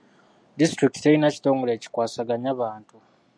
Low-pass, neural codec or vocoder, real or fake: 9.9 kHz; none; real